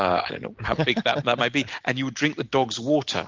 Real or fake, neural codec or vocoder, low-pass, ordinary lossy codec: real; none; 7.2 kHz; Opus, 24 kbps